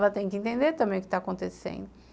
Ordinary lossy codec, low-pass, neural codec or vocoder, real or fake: none; none; none; real